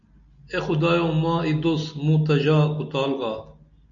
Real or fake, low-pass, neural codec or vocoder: real; 7.2 kHz; none